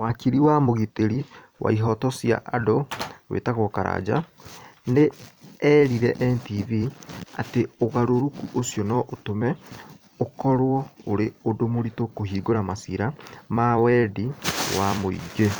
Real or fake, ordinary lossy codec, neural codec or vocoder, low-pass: fake; none; vocoder, 44.1 kHz, 128 mel bands every 256 samples, BigVGAN v2; none